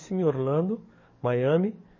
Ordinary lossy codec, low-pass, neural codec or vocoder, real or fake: MP3, 32 kbps; 7.2 kHz; codec, 44.1 kHz, 7.8 kbps, DAC; fake